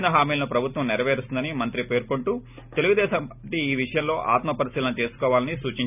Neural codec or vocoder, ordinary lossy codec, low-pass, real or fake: none; none; 3.6 kHz; real